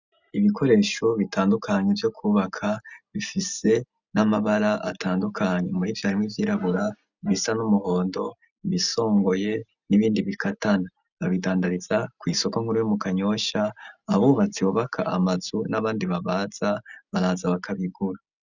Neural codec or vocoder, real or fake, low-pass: none; real; 7.2 kHz